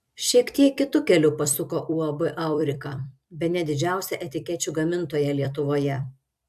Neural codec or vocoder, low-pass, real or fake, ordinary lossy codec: vocoder, 44.1 kHz, 128 mel bands every 256 samples, BigVGAN v2; 14.4 kHz; fake; AAC, 96 kbps